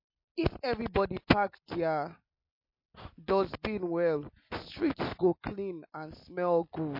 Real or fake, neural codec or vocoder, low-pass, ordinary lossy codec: real; none; 5.4 kHz; MP3, 32 kbps